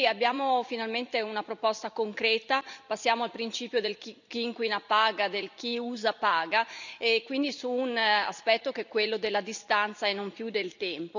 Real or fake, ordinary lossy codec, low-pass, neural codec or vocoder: fake; none; 7.2 kHz; vocoder, 44.1 kHz, 128 mel bands every 256 samples, BigVGAN v2